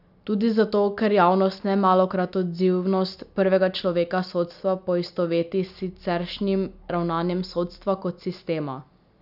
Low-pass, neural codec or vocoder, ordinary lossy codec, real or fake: 5.4 kHz; none; none; real